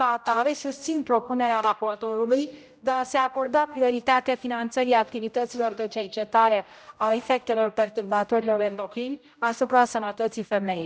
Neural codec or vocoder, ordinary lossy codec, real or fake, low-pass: codec, 16 kHz, 0.5 kbps, X-Codec, HuBERT features, trained on general audio; none; fake; none